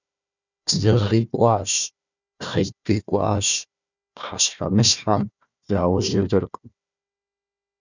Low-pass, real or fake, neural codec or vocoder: 7.2 kHz; fake; codec, 16 kHz, 1 kbps, FunCodec, trained on Chinese and English, 50 frames a second